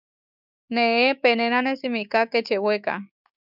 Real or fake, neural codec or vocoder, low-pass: fake; codec, 16 kHz, 6 kbps, DAC; 5.4 kHz